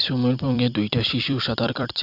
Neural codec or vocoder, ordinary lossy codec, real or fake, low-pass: vocoder, 44.1 kHz, 128 mel bands every 256 samples, BigVGAN v2; Opus, 64 kbps; fake; 5.4 kHz